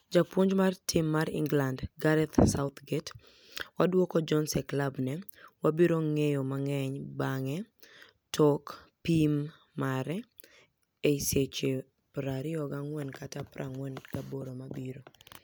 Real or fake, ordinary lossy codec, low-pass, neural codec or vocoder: real; none; none; none